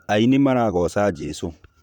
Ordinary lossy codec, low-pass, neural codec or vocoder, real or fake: none; 19.8 kHz; vocoder, 44.1 kHz, 128 mel bands, Pupu-Vocoder; fake